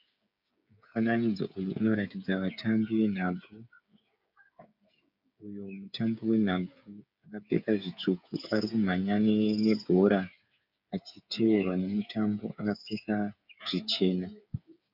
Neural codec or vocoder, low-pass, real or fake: codec, 16 kHz, 8 kbps, FreqCodec, smaller model; 5.4 kHz; fake